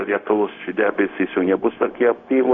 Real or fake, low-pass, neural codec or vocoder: fake; 7.2 kHz; codec, 16 kHz, 0.4 kbps, LongCat-Audio-Codec